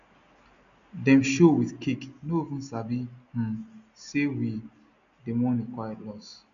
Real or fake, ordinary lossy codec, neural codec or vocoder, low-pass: real; none; none; 7.2 kHz